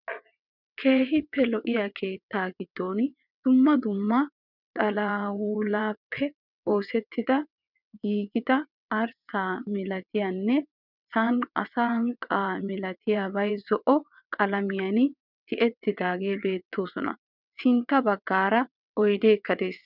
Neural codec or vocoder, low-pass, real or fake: vocoder, 22.05 kHz, 80 mel bands, WaveNeXt; 5.4 kHz; fake